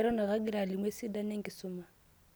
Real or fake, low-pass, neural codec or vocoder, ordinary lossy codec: real; none; none; none